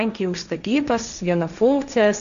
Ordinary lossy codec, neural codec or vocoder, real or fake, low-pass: MP3, 96 kbps; codec, 16 kHz, 1.1 kbps, Voila-Tokenizer; fake; 7.2 kHz